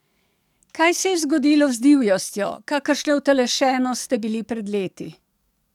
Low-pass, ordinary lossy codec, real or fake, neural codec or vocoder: 19.8 kHz; none; fake; codec, 44.1 kHz, 7.8 kbps, DAC